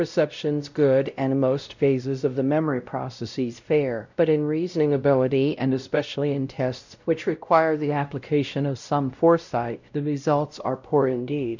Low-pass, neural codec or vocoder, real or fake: 7.2 kHz; codec, 16 kHz, 0.5 kbps, X-Codec, WavLM features, trained on Multilingual LibriSpeech; fake